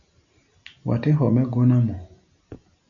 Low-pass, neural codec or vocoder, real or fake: 7.2 kHz; none; real